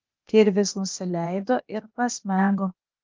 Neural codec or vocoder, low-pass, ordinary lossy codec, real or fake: codec, 16 kHz, 0.8 kbps, ZipCodec; 7.2 kHz; Opus, 32 kbps; fake